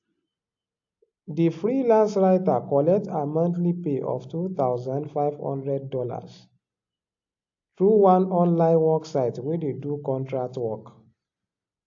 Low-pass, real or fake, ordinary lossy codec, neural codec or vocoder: 7.2 kHz; real; AAC, 48 kbps; none